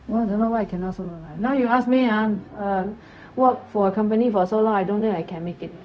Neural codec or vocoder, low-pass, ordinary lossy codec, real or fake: codec, 16 kHz, 0.4 kbps, LongCat-Audio-Codec; none; none; fake